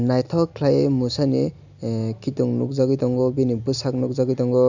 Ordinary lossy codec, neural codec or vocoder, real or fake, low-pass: none; none; real; 7.2 kHz